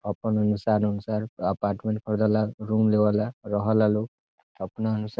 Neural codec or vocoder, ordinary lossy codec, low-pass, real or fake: none; none; none; real